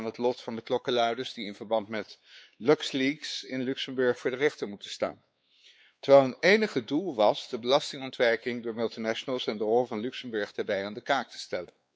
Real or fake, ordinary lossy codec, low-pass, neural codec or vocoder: fake; none; none; codec, 16 kHz, 4 kbps, X-Codec, WavLM features, trained on Multilingual LibriSpeech